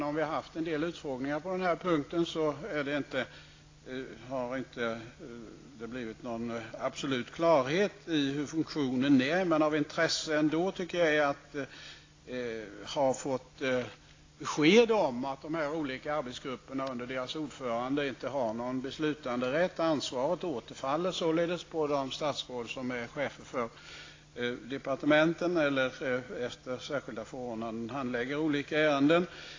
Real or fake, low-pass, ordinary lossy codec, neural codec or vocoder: real; 7.2 kHz; AAC, 32 kbps; none